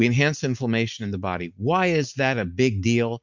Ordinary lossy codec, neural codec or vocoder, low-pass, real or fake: MP3, 64 kbps; none; 7.2 kHz; real